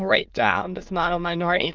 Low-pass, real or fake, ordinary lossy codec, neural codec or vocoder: 7.2 kHz; fake; Opus, 32 kbps; autoencoder, 22.05 kHz, a latent of 192 numbers a frame, VITS, trained on many speakers